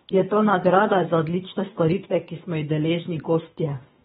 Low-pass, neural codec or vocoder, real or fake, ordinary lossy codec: 10.8 kHz; codec, 24 kHz, 3 kbps, HILCodec; fake; AAC, 16 kbps